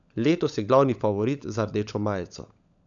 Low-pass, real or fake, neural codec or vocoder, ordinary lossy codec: 7.2 kHz; fake; codec, 16 kHz, 16 kbps, FunCodec, trained on LibriTTS, 50 frames a second; none